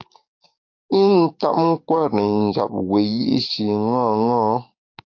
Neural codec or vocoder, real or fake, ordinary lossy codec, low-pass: codec, 44.1 kHz, 7.8 kbps, DAC; fake; AAC, 48 kbps; 7.2 kHz